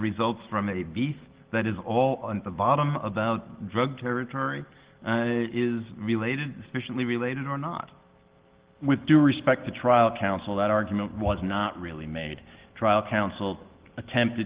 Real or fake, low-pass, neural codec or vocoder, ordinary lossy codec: real; 3.6 kHz; none; Opus, 16 kbps